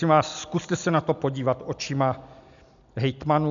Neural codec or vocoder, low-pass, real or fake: none; 7.2 kHz; real